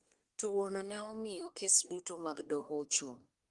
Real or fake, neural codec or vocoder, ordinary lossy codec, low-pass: fake; codec, 24 kHz, 1 kbps, SNAC; Opus, 24 kbps; 10.8 kHz